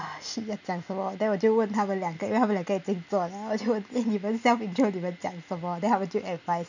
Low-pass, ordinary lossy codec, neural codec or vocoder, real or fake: 7.2 kHz; none; none; real